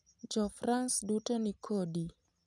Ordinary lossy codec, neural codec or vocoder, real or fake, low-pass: none; none; real; none